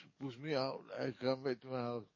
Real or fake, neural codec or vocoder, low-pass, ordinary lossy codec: fake; codec, 44.1 kHz, 7.8 kbps, DAC; 7.2 kHz; MP3, 32 kbps